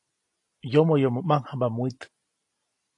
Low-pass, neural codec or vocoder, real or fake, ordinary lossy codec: 10.8 kHz; none; real; AAC, 48 kbps